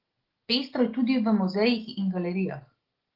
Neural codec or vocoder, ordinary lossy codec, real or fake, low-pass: none; Opus, 16 kbps; real; 5.4 kHz